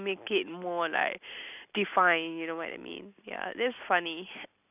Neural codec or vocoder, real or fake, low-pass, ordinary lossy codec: none; real; 3.6 kHz; none